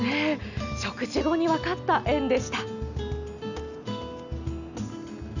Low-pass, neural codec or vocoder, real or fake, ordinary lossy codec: 7.2 kHz; none; real; none